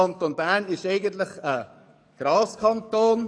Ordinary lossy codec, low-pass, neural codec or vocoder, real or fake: none; 9.9 kHz; codec, 44.1 kHz, 7.8 kbps, DAC; fake